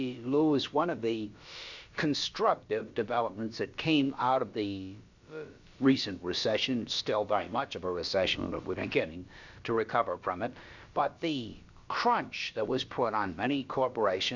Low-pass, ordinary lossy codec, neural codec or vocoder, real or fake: 7.2 kHz; Opus, 64 kbps; codec, 16 kHz, about 1 kbps, DyCAST, with the encoder's durations; fake